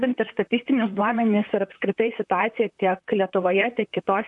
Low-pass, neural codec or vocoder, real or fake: 10.8 kHz; vocoder, 44.1 kHz, 128 mel bands, Pupu-Vocoder; fake